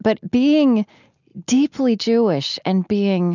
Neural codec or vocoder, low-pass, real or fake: none; 7.2 kHz; real